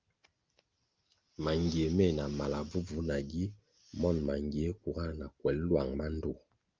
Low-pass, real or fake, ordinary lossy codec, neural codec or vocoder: 7.2 kHz; real; Opus, 24 kbps; none